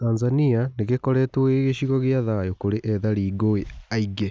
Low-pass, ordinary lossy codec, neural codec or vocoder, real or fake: none; none; none; real